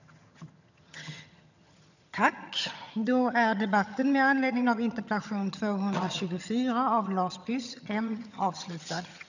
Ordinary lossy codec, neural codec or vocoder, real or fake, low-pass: none; vocoder, 22.05 kHz, 80 mel bands, HiFi-GAN; fake; 7.2 kHz